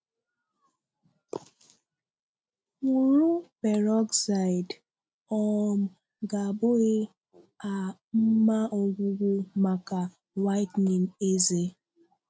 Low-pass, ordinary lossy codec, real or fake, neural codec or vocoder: none; none; real; none